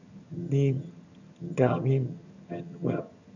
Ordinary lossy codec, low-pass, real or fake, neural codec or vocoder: none; 7.2 kHz; fake; vocoder, 22.05 kHz, 80 mel bands, HiFi-GAN